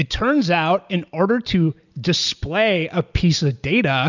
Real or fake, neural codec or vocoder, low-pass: real; none; 7.2 kHz